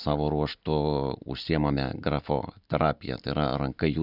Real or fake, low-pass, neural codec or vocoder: real; 5.4 kHz; none